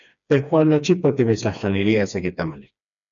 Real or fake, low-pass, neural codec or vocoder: fake; 7.2 kHz; codec, 16 kHz, 2 kbps, FreqCodec, smaller model